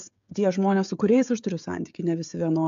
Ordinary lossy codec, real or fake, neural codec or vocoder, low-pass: AAC, 96 kbps; fake; codec, 16 kHz, 16 kbps, FreqCodec, smaller model; 7.2 kHz